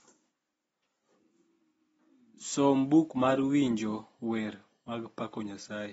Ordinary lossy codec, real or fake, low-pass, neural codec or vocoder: AAC, 24 kbps; real; 19.8 kHz; none